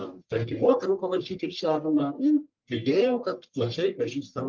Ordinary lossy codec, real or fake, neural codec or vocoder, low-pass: Opus, 32 kbps; fake; codec, 44.1 kHz, 1.7 kbps, Pupu-Codec; 7.2 kHz